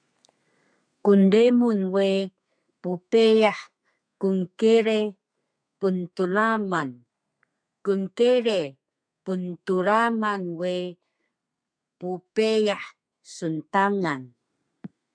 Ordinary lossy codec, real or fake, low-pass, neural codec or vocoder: MP3, 96 kbps; fake; 9.9 kHz; codec, 32 kHz, 1.9 kbps, SNAC